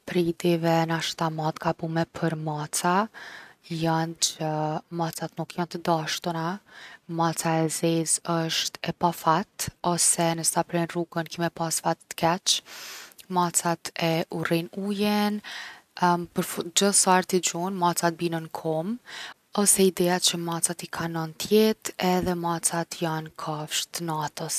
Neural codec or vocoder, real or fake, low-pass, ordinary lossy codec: none; real; 14.4 kHz; none